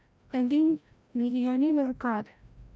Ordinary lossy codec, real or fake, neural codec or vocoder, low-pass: none; fake; codec, 16 kHz, 0.5 kbps, FreqCodec, larger model; none